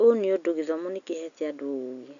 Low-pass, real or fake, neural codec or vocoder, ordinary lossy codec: 7.2 kHz; real; none; none